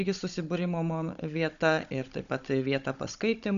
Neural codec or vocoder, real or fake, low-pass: codec, 16 kHz, 16 kbps, FunCodec, trained on Chinese and English, 50 frames a second; fake; 7.2 kHz